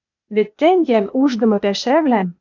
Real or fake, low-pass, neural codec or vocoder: fake; 7.2 kHz; codec, 16 kHz, 0.8 kbps, ZipCodec